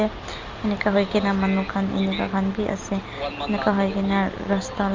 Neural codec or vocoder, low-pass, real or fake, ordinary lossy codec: none; 7.2 kHz; real; Opus, 32 kbps